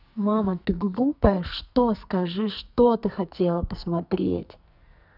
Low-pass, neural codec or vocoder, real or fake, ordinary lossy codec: 5.4 kHz; codec, 44.1 kHz, 2.6 kbps, SNAC; fake; none